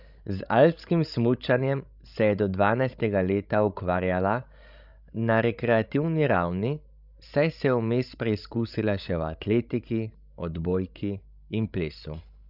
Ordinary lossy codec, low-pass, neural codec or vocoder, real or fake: none; 5.4 kHz; codec, 16 kHz, 16 kbps, FreqCodec, larger model; fake